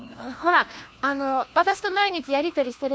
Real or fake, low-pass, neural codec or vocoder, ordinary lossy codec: fake; none; codec, 16 kHz, 1 kbps, FunCodec, trained on LibriTTS, 50 frames a second; none